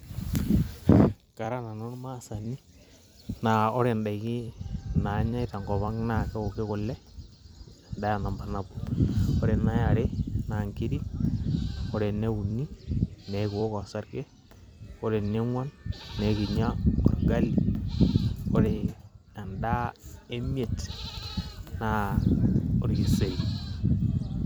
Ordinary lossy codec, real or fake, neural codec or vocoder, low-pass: none; fake; vocoder, 44.1 kHz, 128 mel bands every 256 samples, BigVGAN v2; none